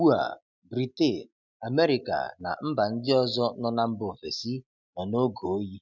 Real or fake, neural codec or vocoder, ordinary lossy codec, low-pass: real; none; none; 7.2 kHz